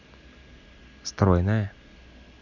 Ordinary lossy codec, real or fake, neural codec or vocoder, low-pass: none; real; none; 7.2 kHz